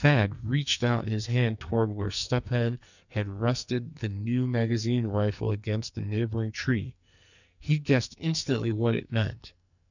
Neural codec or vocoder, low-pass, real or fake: codec, 44.1 kHz, 2.6 kbps, SNAC; 7.2 kHz; fake